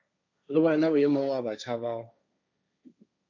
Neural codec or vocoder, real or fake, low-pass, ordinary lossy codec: codec, 16 kHz, 1.1 kbps, Voila-Tokenizer; fake; 7.2 kHz; MP3, 48 kbps